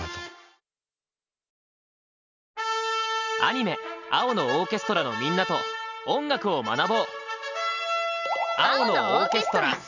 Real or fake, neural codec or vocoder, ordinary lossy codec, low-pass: real; none; none; 7.2 kHz